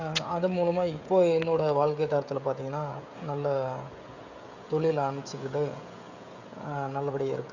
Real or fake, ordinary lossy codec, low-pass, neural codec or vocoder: fake; none; 7.2 kHz; codec, 16 kHz, 16 kbps, FreqCodec, smaller model